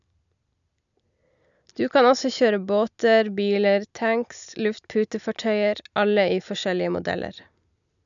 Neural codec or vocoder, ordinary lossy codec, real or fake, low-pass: none; none; real; 7.2 kHz